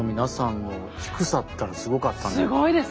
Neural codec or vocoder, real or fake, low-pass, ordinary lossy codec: none; real; none; none